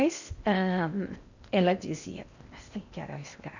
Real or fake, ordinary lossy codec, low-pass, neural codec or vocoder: fake; none; 7.2 kHz; codec, 16 kHz in and 24 kHz out, 0.8 kbps, FocalCodec, streaming, 65536 codes